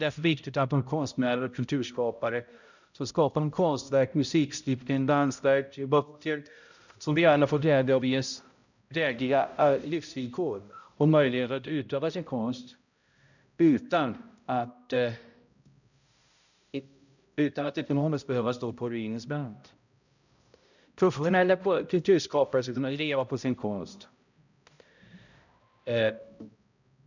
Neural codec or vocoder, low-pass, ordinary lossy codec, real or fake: codec, 16 kHz, 0.5 kbps, X-Codec, HuBERT features, trained on balanced general audio; 7.2 kHz; none; fake